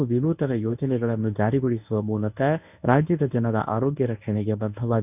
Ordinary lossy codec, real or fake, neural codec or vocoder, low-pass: none; fake; codec, 24 kHz, 0.9 kbps, WavTokenizer, medium speech release version 1; 3.6 kHz